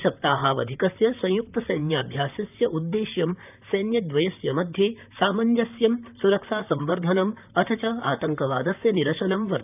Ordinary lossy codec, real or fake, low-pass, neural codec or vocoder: none; fake; 3.6 kHz; vocoder, 44.1 kHz, 128 mel bands, Pupu-Vocoder